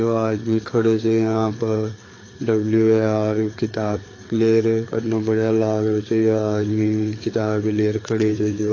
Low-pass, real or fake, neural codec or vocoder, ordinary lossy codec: 7.2 kHz; fake; codec, 16 kHz, 4 kbps, FreqCodec, larger model; AAC, 32 kbps